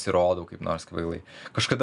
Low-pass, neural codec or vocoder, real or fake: 10.8 kHz; none; real